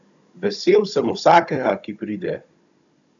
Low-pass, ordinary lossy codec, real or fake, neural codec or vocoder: 7.2 kHz; none; fake; codec, 16 kHz, 16 kbps, FunCodec, trained on Chinese and English, 50 frames a second